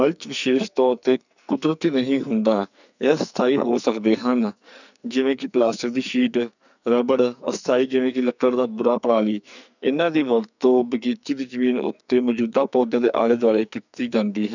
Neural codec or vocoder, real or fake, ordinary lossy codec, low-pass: codec, 44.1 kHz, 2.6 kbps, SNAC; fake; none; 7.2 kHz